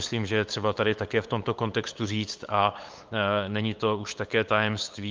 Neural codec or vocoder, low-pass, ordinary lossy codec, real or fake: codec, 16 kHz, 8 kbps, FunCodec, trained on LibriTTS, 25 frames a second; 7.2 kHz; Opus, 24 kbps; fake